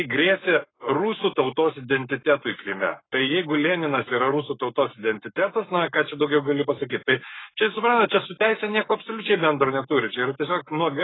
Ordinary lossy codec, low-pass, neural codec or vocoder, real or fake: AAC, 16 kbps; 7.2 kHz; codec, 44.1 kHz, 7.8 kbps, Pupu-Codec; fake